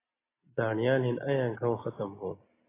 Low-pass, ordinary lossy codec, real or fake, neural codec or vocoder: 3.6 kHz; AAC, 16 kbps; real; none